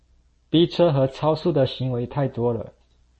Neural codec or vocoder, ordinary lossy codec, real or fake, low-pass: none; MP3, 32 kbps; real; 10.8 kHz